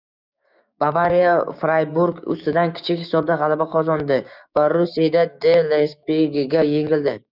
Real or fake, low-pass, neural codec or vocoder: fake; 5.4 kHz; codec, 16 kHz, 6 kbps, DAC